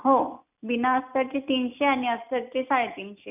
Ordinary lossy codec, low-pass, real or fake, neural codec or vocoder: none; 3.6 kHz; real; none